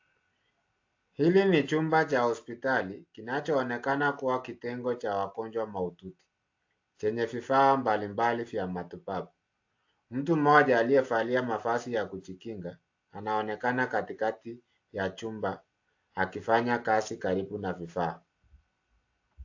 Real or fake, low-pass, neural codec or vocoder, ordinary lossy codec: real; 7.2 kHz; none; AAC, 48 kbps